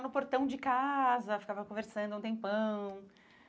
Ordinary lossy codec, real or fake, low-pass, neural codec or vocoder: none; real; none; none